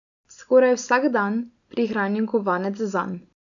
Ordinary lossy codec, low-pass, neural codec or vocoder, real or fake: none; 7.2 kHz; none; real